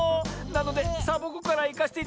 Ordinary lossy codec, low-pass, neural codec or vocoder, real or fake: none; none; none; real